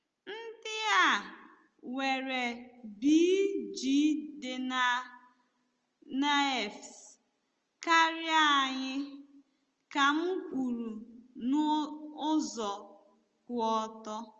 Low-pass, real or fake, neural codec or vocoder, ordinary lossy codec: 7.2 kHz; real; none; Opus, 24 kbps